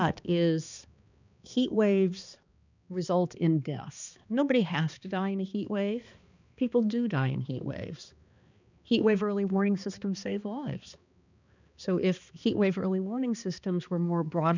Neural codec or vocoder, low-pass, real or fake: codec, 16 kHz, 2 kbps, X-Codec, HuBERT features, trained on balanced general audio; 7.2 kHz; fake